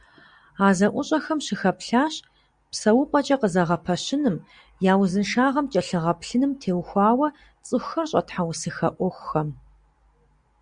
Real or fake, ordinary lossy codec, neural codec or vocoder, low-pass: real; Opus, 64 kbps; none; 9.9 kHz